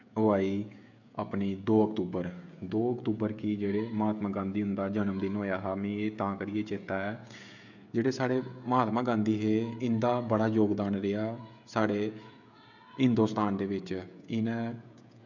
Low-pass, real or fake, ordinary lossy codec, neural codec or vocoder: 7.2 kHz; fake; none; codec, 16 kHz, 16 kbps, FreqCodec, smaller model